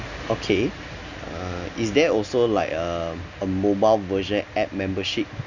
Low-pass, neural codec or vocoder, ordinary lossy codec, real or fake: 7.2 kHz; none; none; real